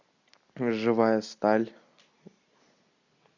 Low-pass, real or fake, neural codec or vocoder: 7.2 kHz; real; none